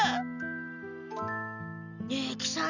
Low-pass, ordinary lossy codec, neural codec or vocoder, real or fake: 7.2 kHz; none; none; real